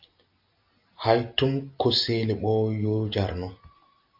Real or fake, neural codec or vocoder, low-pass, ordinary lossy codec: real; none; 5.4 kHz; AAC, 48 kbps